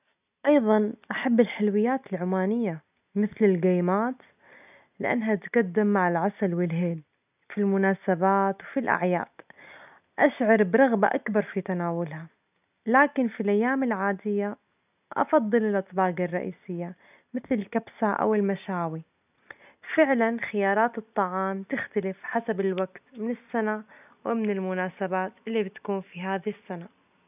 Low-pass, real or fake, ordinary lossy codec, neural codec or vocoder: 3.6 kHz; real; none; none